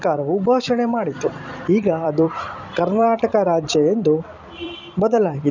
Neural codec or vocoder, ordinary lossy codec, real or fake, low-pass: vocoder, 44.1 kHz, 128 mel bands every 256 samples, BigVGAN v2; none; fake; 7.2 kHz